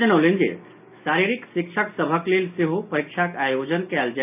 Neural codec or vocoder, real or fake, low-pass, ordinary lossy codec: none; real; 3.6 kHz; none